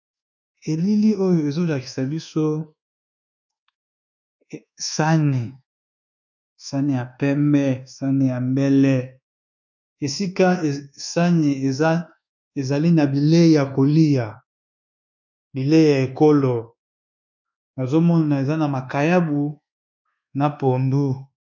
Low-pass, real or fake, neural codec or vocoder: 7.2 kHz; fake; codec, 24 kHz, 1.2 kbps, DualCodec